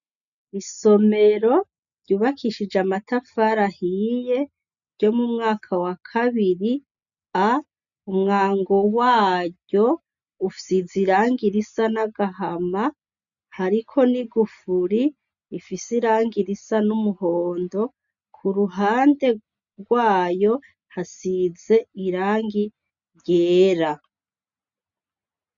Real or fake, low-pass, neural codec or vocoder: real; 7.2 kHz; none